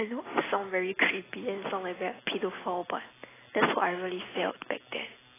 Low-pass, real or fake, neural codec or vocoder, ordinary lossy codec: 3.6 kHz; real; none; AAC, 16 kbps